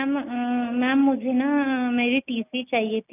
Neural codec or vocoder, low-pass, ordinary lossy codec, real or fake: none; 3.6 kHz; none; real